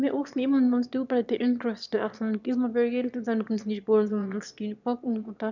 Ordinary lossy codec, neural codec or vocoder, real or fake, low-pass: none; autoencoder, 22.05 kHz, a latent of 192 numbers a frame, VITS, trained on one speaker; fake; 7.2 kHz